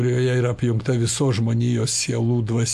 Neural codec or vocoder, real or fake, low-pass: none; real; 14.4 kHz